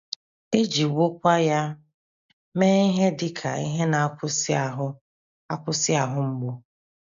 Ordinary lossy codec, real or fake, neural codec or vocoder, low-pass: AAC, 96 kbps; real; none; 7.2 kHz